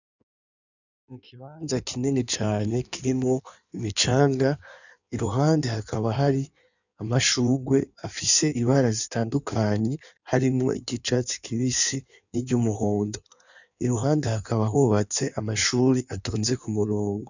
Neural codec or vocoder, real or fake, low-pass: codec, 16 kHz in and 24 kHz out, 1.1 kbps, FireRedTTS-2 codec; fake; 7.2 kHz